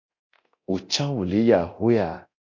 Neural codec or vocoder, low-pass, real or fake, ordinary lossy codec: codec, 24 kHz, 0.9 kbps, DualCodec; 7.2 kHz; fake; MP3, 48 kbps